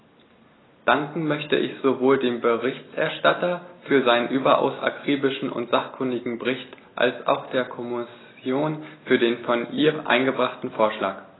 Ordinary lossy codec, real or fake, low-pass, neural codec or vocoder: AAC, 16 kbps; real; 7.2 kHz; none